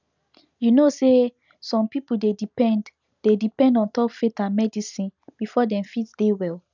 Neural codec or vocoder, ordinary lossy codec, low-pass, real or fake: none; none; 7.2 kHz; real